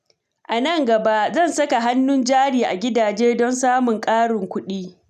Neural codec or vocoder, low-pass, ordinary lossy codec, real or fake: none; 14.4 kHz; none; real